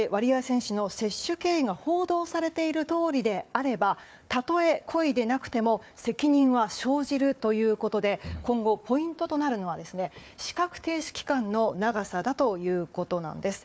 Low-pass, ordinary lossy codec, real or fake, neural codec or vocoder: none; none; fake; codec, 16 kHz, 4 kbps, FunCodec, trained on Chinese and English, 50 frames a second